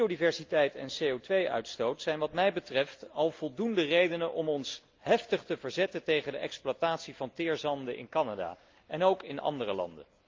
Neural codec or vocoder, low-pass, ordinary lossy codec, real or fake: none; 7.2 kHz; Opus, 24 kbps; real